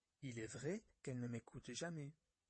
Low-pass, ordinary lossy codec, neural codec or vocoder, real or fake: 9.9 kHz; MP3, 32 kbps; vocoder, 22.05 kHz, 80 mel bands, WaveNeXt; fake